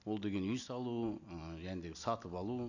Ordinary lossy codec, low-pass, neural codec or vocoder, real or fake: none; 7.2 kHz; none; real